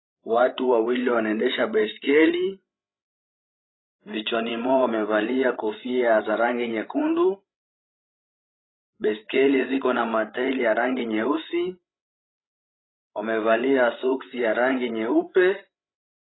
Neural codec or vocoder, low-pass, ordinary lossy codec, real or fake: codec, 16 kHz, 16 kbps, FreqCodec, larger model; 7.2 kHz; AAC, 16 kbps; fake